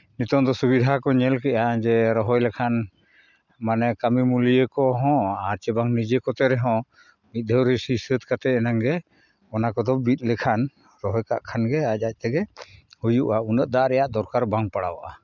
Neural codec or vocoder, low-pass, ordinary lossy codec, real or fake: none; 7.2 kHz; none; real